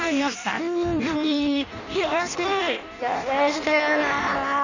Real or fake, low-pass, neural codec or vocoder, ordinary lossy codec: fake; 7.2 kHz; codec, 16 kHz in and 24 kHz out, 0.6 kbps, FireRedTTS-2 codec; none